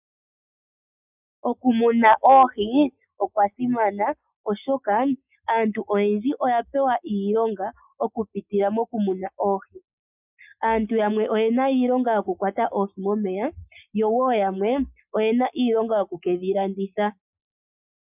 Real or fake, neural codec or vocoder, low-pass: real; none; 3.6 kHz